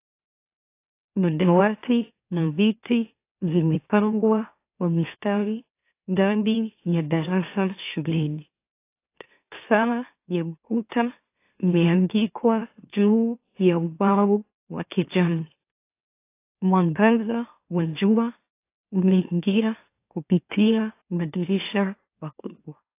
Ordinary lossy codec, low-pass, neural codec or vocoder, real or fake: AAC, 24 kbps; 3.6 kHz; autoencoder, 44.1 kHz, a latent of 192 numbers a frame, MeloTTS; fake